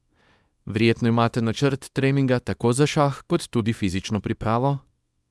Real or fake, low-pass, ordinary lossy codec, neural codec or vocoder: fake; none; none; codec, 24 kHz, 0.9 kbps, WavTokenizer, small release